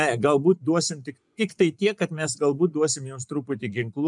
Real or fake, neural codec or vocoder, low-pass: fake; vocoder, 24 kHz, 100 mel bands, Vocos; 10.8 kHz